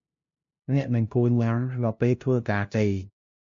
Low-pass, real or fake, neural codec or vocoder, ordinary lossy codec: 7.2 kHz; fake; codec, 16 kHz, 0.5 kbps, FunCodec, trained on LibriTTS, 25 frames a second; MP3, 48 kbps